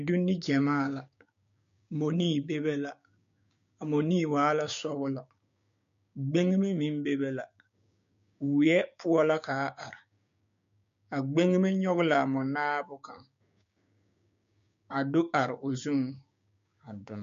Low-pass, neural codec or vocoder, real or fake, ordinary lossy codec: 7.2 kHz; codec, 16 kHz, 6 kbps, DAC; fake; MP3, 48 kbps